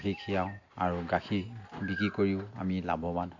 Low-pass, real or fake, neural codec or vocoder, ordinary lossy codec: 7.2 kHz; real; none; AAC, 48 kbps